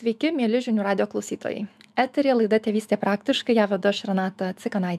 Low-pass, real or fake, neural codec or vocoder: 14.4 kHz; fake; autoencoder, 48 kHz, 128 numbers a frame, DAC-VAE, trained on Japanese speech